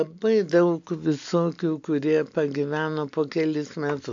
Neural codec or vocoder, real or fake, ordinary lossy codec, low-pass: none; real; AAC, 64 kbps; 7.2 kHz